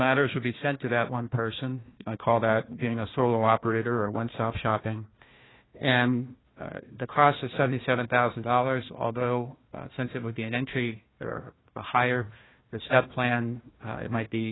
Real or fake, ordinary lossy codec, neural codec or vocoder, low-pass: fake; AAC, 16 kbps; codec, 16 kHz, 1 kbps, FunCodec, trained on Chinese and English, 50 frames a second; 7.2 kHz